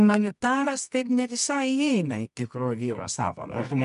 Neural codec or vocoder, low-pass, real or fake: codec, 24 kHz, 0.9 kbps, WavTokenizer, medium music audio release; 10.8 kHz; fake